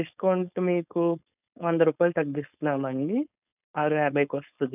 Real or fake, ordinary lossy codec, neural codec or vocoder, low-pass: fake; none; codec, 16 kHz, 4.8 kbps, FACodec; 3.6 kHz